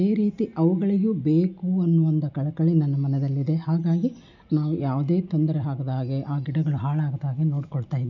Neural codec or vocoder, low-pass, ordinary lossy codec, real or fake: none; 7.2 kHz; none; real